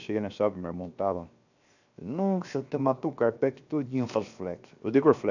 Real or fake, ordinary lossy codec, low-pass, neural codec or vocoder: fake; none; 7.2 kHz; codec, 16 kHz, 0.7 kbps, FocalCodec